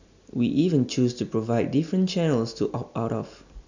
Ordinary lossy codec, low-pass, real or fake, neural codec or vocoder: none; 7.2 kHz; real; none